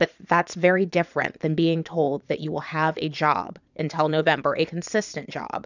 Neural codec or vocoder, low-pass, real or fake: vocoder, 22.05 kHz, 80 mel bands, Vocos; 7.2 kHz; fake